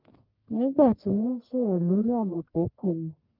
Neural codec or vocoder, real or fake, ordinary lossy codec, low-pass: codec, 44.1 kHz, 1.7 kbps, Pupu-Codec; fake; Opus, 32 kbps; 5.4 kHz